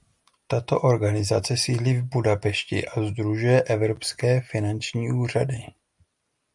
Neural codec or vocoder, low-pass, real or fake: none; 10.8 kHz; real